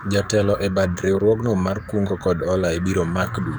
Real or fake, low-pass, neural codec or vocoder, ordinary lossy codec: fake; none; codec, 44.1 kHz, 7.8 kbps, DAC; none